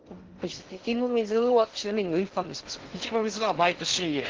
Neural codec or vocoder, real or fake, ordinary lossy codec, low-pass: codec, 16 kHz in and 24 kHz out, 0.6 kbps, FocalCodec, streaming, 2048 codes; fake; Opus, 16 kbps; 7.2 kHz